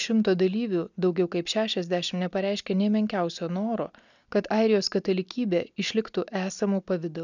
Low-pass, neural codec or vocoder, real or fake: 7.2 kHz; none; real